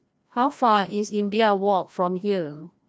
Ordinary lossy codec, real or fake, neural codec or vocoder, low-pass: none; fake; codec, 16 kHz, 1 kbps, FreqCodec, larger model; none